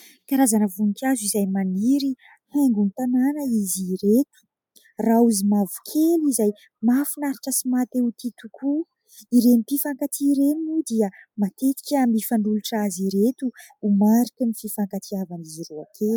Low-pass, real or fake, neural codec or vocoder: 19.8 kHz; real; none